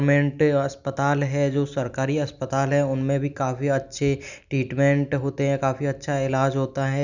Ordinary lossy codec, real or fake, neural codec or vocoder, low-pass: none; real; none; 7.2 kHz